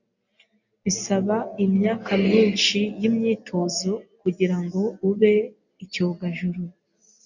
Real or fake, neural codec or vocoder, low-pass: real; none; 7.2 kHz